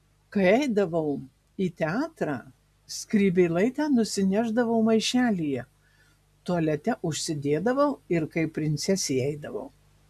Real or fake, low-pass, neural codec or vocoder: real; 14.4 kHz; none